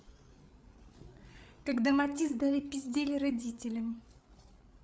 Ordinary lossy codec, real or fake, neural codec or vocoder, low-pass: none; fake; codec, 16 kHz, 8 kbps, FreqCodec, larger model; none